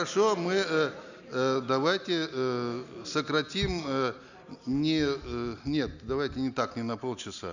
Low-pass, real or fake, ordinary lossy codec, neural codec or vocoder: 7.2 kHz; real; none; none